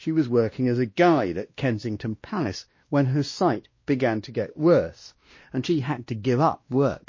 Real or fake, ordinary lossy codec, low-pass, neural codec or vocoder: fake; MP3, 32 kbps; 7.2 kHz; codec, 16 kHz, 1 kbps, X-Codec, WavLM features, trained on Multilingual LibriSpeech